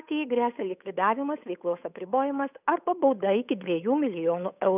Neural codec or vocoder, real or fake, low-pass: codec, 16 kHz, 8 kbps, FunCodec, trained on Chinese and English, 25 frames a second; fake; 3.6 kHz